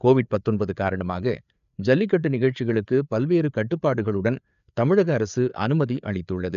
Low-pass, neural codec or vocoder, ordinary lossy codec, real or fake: 7.2 kHz; codec, 16 kHz, 4 kbps, FreqCodec, larger model; none; fake